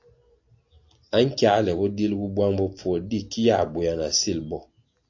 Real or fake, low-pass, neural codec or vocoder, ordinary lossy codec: real; 7.2 kHz; none; AAC, 48 kbps